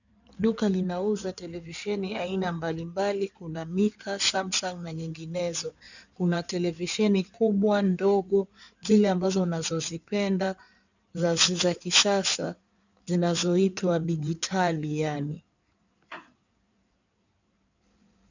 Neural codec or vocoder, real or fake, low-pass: codec, 16 kHz in and 24 kHz out, 2.2 kbps, FireRedTTS-2 codec; fake; 7.2 kHz